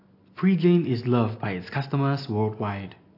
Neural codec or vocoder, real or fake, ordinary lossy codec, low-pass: none; real; AAC, 32 kbps; 5.4 kHz